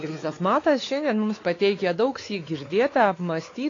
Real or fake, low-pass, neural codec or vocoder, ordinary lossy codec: fake; 7.2 kHz; codec, 16 kHz, 4 kbps, X-Codec, WavLM features, trained on Multilingual LibriSpeech; AAC, 32 kbps